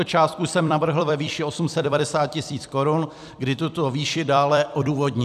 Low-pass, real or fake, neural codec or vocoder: 14.4 kHz; fake; vocoder, 44.1 kHz, 128 mel bands every 256 samples, BigVGAN v2